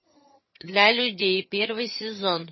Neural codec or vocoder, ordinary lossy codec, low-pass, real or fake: vocoder, 22.05 kHz, 80 mel bands, HiFi-GAN; MP3, 24 kbps; 7.2 kHz; fake